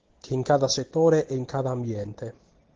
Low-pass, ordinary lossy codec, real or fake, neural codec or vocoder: 7.2 kHz; Opus, 16 kbps; real; none